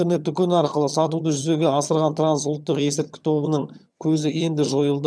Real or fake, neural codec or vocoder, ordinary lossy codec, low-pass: fake; vocoder, 22.05 kHz, 80 mel bands, HiFi-GAN; none; none